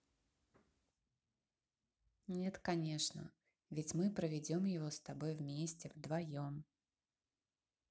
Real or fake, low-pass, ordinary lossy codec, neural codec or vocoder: real; none; none; none